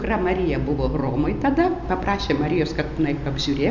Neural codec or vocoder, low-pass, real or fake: none; 7.2 kHz; real